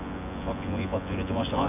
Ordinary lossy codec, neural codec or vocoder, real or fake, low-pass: none; vocoder, 24 kHz, 100 mel bands, Vocos; fake; 3.6 kHz